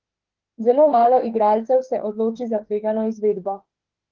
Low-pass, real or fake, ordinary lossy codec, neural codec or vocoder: 7.2 kHz; fake; Opus, 16 kbps; autoencoder, 48 kHz, 32 numbers a frame, DAC-VAE, trained on Japanese speech